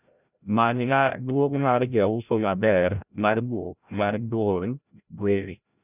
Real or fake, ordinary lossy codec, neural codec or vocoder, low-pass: fake; none; codec, 16 kHz, 0.5 kbps, FreqCodec, larger model; 3.6 kHz